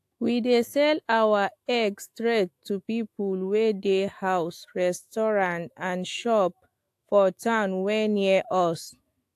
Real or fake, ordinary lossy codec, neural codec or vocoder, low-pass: fake; AAC, 64 kbps; autoencoder, 48 kHz, 128 numbers a frame, DAC-VAE, trained on Japanese speech; 14.4 kHz